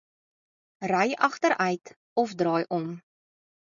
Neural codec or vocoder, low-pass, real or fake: none; 7.2 kHz; real